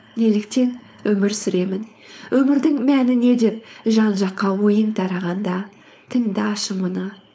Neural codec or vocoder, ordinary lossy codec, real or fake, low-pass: codec, 16 kHz, 4.8 kbps, FACodec; none; fake; none